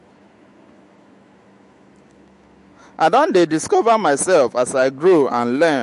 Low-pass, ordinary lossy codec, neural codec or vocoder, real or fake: 14.4 kHz; MP3, 48 kbps; autoencoder, 48 kHz, 128 numbers a frame, DAC-VAE, trained on Japanese speech; fake